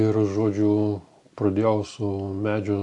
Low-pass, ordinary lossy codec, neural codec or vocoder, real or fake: 10.8 kHz; AAC, 64 kbps; none; real